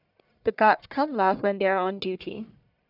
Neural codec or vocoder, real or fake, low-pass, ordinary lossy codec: codec, 44.1 kHz, 1.7 kbps, Pupu-Codec; fake; 5.4 kHz; none